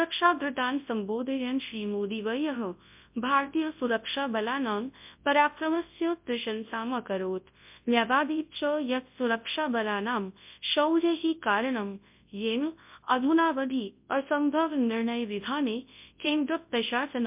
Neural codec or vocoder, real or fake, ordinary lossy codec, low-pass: codec, 24 kHz, 0.9 kbps, WavTokenizer, large speech release; fake; MP3, 32 kbps; 3.6 kHz